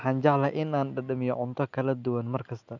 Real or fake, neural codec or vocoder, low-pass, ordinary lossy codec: real; none; 7.2 kHz; none